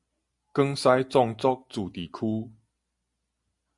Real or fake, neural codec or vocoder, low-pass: real; none; 10.8 kHz